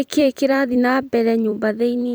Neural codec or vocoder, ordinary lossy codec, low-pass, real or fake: vocoder, 44.1 kHz, 128 mel bands every 256 samples, BigVGAN v2; none; none; fake